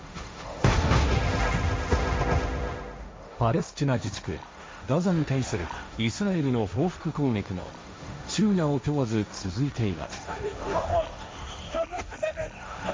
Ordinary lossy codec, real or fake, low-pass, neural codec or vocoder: none; fake; none; codec, 16 kHz, 1.1 kbps, Voila-Tokenizer